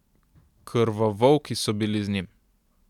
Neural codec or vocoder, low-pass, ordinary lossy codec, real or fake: none; 19.8 kHz; none; real